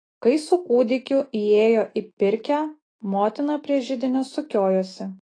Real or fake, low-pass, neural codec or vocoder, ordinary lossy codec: fake; 9.9 kHz; autoencoder, 48 kHz, 128 numbers a frame, DAC-VAE, trained on Japanese speech; AAC, 32 kbps